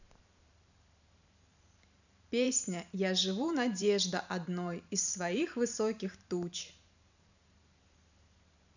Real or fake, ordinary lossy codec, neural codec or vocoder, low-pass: fake; none; vocoder, 44.1 kHz, 128 mel bands every 512 samples, BigVGAN v2; 7.2 kHz